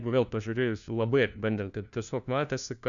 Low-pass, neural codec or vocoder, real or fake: 7.2 kHz; codec, 16 kHz, 1 kbps, FunCodec, trained on LibriTTS, 50 frames a second; fake